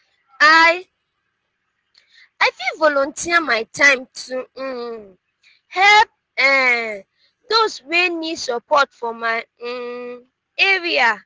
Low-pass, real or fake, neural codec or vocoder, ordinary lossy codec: 7.2 kHz; real; none; Opus, 16 kbps